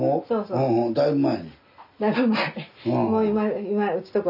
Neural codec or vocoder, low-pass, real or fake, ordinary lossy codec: none; 5.4 kHz; real; none